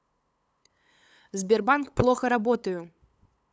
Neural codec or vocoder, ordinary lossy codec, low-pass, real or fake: codec, 16 kHz, 8 kbps, FunCodec, trained on LibriTTS, 25 frames a second; none; none; fake